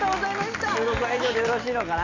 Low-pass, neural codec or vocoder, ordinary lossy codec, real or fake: 7.2 kHz; none; none; real